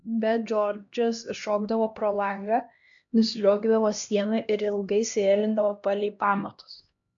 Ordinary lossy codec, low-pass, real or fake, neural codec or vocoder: AAC, 64 kbps; 7.2 kHz; fake; codec, 16 kHz, 1 kbps, X-Codec, HuBERT features, trained on LibriSpeech